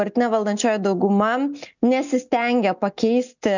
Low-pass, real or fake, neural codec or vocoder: 7.2 kHz; real; none